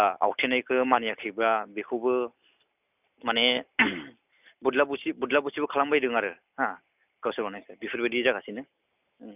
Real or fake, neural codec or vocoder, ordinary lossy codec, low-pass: real; none; none; 3.6 kHz